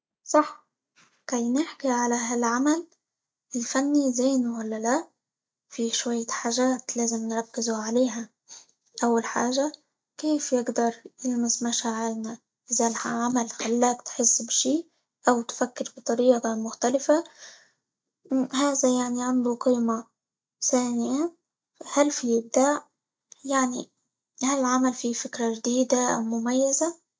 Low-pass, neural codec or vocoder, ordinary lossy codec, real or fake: none; none; none; real